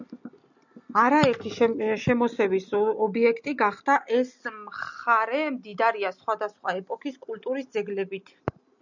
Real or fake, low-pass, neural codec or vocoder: real; 7.2 kHz; none